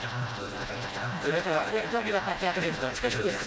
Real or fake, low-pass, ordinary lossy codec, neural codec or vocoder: fake; none; none; codec, 16 kHz, 0.5 kbps, FreqCodec, smaller model